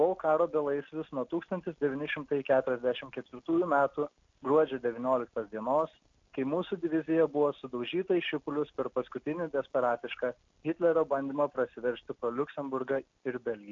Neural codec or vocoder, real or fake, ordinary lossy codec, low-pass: none; real; AAC, 48 kbps; 7.2 kHz